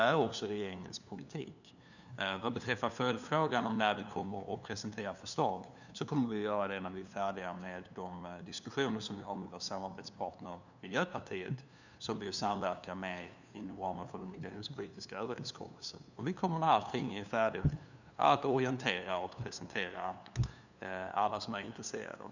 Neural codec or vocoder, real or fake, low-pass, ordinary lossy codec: codec, 16 kHz, 2 kbps, FunCodec, trained on LibriTTS, 25 frames a second; fake; 7.2 kHz; none